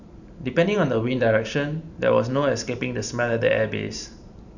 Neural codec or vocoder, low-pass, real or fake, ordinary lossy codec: vocoder, 44.1 kHz, 128 mel bands every 512 samples, BigVGAN v2; 7.2 kHz; fake; none